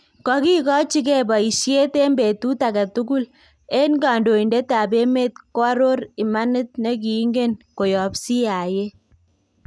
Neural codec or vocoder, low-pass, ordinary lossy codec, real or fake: none; none; none; real